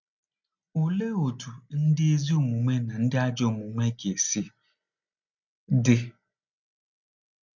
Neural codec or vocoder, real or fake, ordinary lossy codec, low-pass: none; real; none; 7.2 kHz